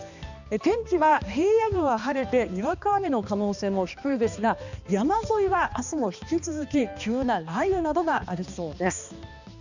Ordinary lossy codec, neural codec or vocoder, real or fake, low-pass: none; codec, 16 kHz, 2 kbps, X-Codec, HuBERT features, trained on balanced general audio; fake; 7.2 kHz